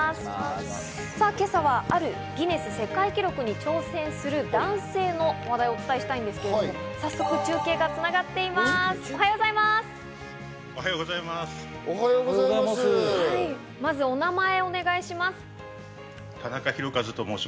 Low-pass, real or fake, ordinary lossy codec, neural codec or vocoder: none; real; none; none